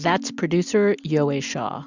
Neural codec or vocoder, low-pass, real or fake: none; 7.2 kHz; real